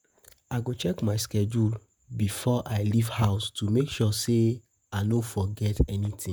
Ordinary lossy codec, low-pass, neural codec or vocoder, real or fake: none; none; vocoder, 48 kHz, 128 mel bands, Vocos; fake